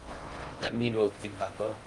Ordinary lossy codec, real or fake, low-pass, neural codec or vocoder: Opus, 24 kbps; fake; 10.8 kHz; codec, 16 kHz in and 24 kHz out, 0.6 kbps, FocalCodec, streaming, 4096 codes